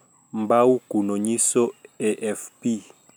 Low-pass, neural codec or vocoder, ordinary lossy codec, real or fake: none; none; none; real